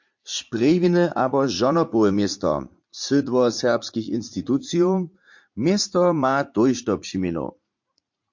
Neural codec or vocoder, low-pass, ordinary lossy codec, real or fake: vocoder, 44.1 kHz, 80 mel bands, Vocos; 7.2 kHz; MP3, 48 kbps; fake